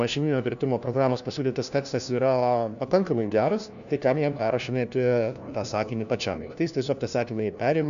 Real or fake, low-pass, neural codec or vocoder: fake; 7.2 kHz; codec, 16 kHz, 1 kbps, FunCodec, trained on LibriTTS, 50 frames a second